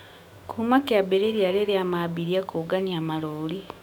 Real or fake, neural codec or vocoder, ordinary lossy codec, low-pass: fake; autoencoder, 48 kHz, 128 numbers a frame, DAC-VAE, trained on Japanese speech; none; 19.8 kHz